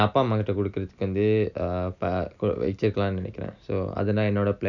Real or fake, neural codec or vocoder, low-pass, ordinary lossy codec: real; none; 7.2 kHz; none